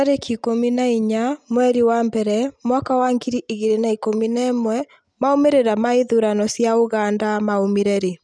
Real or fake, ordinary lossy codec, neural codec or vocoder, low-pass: real; none; none; 9.9 kHz